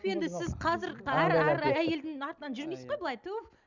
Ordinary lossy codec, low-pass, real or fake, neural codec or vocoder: none; 7.2 kHz; real; none